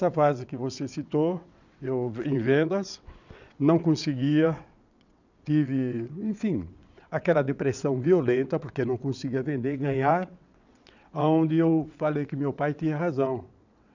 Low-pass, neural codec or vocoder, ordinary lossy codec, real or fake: 7.2 kHz; vocoder, 22.05 kHz, 80 mel bands, WaveNeXt; none; fake